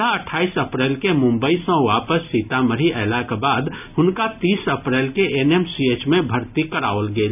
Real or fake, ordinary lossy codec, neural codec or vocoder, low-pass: real; none; none; 3.6 kHz